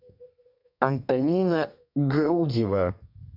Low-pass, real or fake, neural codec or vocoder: 5.4 kHz; fake; codec, 32 kHz, 1.9 kbps, SNAC